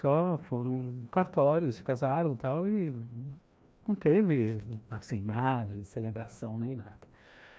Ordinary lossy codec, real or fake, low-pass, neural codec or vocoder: none; fake; none; codec, 16 kHz, 1 kbps, FreqCodec, larger model